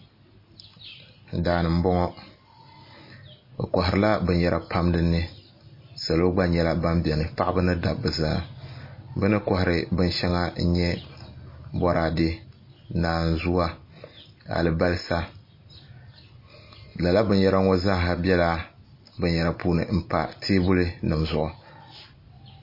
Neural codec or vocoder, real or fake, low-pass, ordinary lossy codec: none; real; 5.4 kHz; MP3, 24 kbps